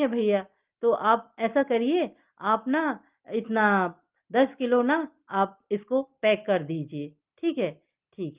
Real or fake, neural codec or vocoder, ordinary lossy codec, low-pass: real; none; Opus, 32 kbps; 3.6 kHz